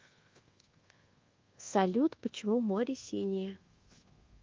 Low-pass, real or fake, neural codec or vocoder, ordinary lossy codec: 7.2 kHz; fake; codec, 24 kHz, 1.2 kbps, DualCodec; Opus, 32 kbps